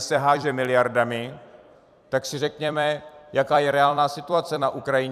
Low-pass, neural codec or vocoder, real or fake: 14.4 kHz; vocoder, 44.1 kHz, 128 mel bands every 256 samples, BigVGAN v2; fake